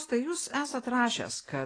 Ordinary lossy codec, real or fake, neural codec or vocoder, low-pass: AAC, 32 kbps; real; none; 9.9 kHz